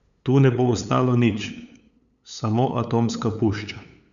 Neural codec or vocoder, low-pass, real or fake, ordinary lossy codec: codec, 16 kHz, 8 kbps, FunCodec, trained on LibriTTS, 25 frames a second; 7.2 kHz; fake; none